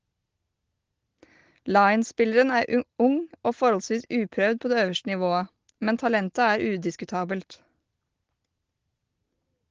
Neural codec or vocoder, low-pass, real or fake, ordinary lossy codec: none; 7.2 kHz; real; Opus, 16 kbps